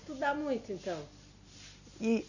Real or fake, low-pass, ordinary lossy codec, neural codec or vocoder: real; 7.2 kHz; none; none